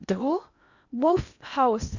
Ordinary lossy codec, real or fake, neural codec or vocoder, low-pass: none; fake; codec, 16 kHz in and 24 kHz out, 0.8 kbps, FocalCodec, streaming, 65536 codes; 7.2 kHz